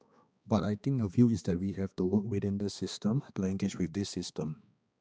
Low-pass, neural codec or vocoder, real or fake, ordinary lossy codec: none; codec, 16 kHz, 2 kbps, X-Codec, HuBERT features, trained on balanced general audio; fake; none